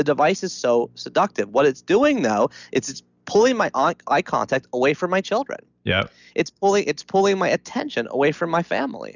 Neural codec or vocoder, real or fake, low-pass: none; real; 7.2 kHz